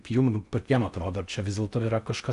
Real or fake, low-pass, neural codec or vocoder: fake; 10.8 kHz; codec, 16 kHz in and 24 kHz out, 0.6 kbps, FocalCodec, streaming, 4096 codes